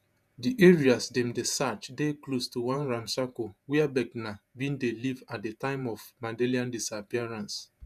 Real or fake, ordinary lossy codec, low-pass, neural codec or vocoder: fake; none; 14.4 kHz; vocoder, 48 kHz, 128 mel bands, Vocos